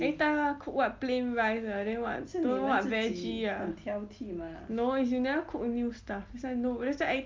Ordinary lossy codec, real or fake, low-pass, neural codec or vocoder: Opus, 32 kbps; real; 7.2 kHz; none